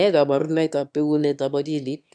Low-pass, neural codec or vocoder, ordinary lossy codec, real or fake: 9.9 kHz; autoencoder, 22.05 kHz, a latent of 192 numbers a frame, VITS, trained on one speaker; none; fake